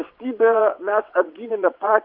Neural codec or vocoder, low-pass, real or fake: vocoder, 22.05 kHz, 80 mel bands, WaveNeXt; 5.4 kHz; fake